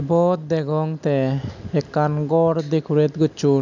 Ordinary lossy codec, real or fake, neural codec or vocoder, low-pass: none; real; none; 7.2 kHz